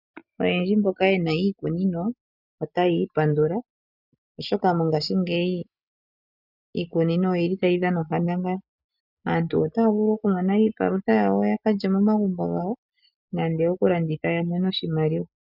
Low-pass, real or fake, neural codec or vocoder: 5.4 kHz; real; none